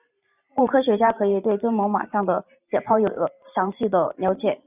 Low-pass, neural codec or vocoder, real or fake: 3.6 kHz; vocoder, 44.1 kHz, 128 mel bands every 512 samples, BigVGAN v2; fake